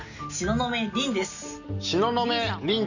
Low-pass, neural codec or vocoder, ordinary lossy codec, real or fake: 7.2 kHz; none; none; real